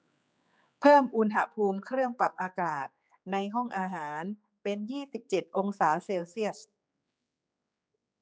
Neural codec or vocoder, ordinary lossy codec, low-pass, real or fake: codec, 16 kHz, 4 kbps, X-Codec, HuBERT features, trained on general audio; none; none; fake